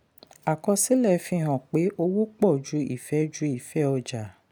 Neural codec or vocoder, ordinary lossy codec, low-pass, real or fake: none; none; none; real